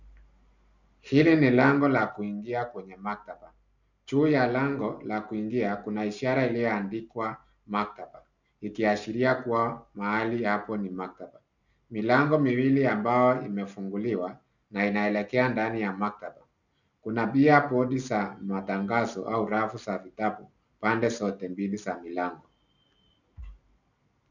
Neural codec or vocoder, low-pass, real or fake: none; 7.2 kHz; real